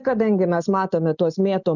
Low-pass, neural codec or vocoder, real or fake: 7.2 kHz; none; real